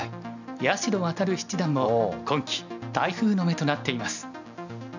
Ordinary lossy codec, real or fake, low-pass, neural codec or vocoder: none; real; 7.2 kHz; none